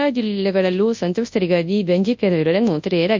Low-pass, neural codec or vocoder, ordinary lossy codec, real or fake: 7.2 kHz; codec, 24 kHz, 0.9 kbps, WavTokenizer, large speech release; MP3, 64 kbps; fake